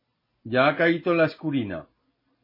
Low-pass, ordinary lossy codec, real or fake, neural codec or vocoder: 5.4 kHz; MP3, 24 kbps; real; none